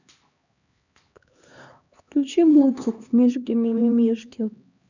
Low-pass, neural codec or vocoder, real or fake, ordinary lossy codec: 7.2 kHz; codec, 16 kHz, 2 kbps, X-Codec, HuBERT features, trained on LibriSpeech; fake; none